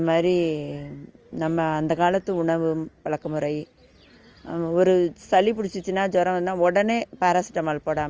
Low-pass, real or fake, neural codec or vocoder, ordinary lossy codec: 7.2 kHz; real; none; Opus, 24 kbps